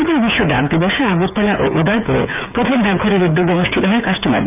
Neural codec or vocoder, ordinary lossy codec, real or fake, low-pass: codec, 16 kHz, 8 kbps, FreqCodec, smaller model; none; fake; 3.6 kHz